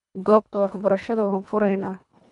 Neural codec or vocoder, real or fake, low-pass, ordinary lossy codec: codec, 24 kHz, 1.5 kbps, HILCodec; fake; 10.8 kHz; none